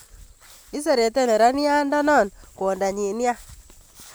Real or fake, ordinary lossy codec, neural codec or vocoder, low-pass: real; none; none; none